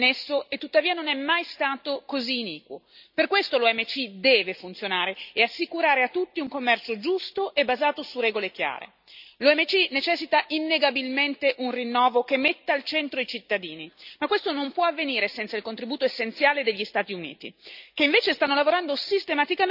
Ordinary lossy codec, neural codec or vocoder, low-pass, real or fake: none; none; 5.4 kHz; real